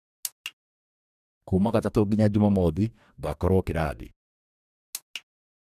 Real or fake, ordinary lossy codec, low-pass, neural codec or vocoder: fake; none; 14.4 kHz; codec, 44.1 kHz, 2.6 kbps, DAC